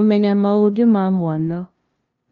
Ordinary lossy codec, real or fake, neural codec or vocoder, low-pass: Opus, 24 kbps; fake; codec, 16 kHz, 0.5 kbps, FunCodec, trained on LibriTTS, 25 frames a second; 7.2 kHz